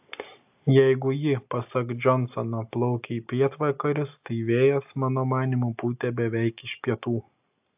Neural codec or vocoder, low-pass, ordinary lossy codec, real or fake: none; 3.6 kHz; AAC, 32 kbps; real